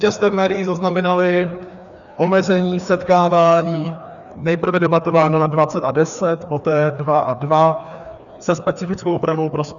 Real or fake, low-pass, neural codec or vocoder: fake; 7.2 kHz; codec, 16 kHz, 2 kbps, FreqCodec, larger model